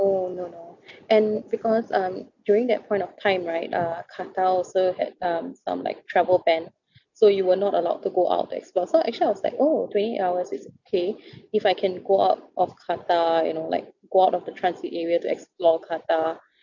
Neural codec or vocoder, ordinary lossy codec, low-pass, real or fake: none; none; 7.2 kHz; real